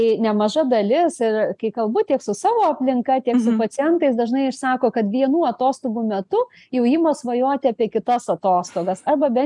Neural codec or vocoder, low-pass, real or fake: none; 10.8 kHz; real